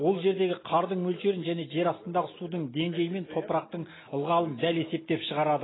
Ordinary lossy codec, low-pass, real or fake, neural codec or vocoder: AAC, 16 kbps; 7.2 kHz; real; none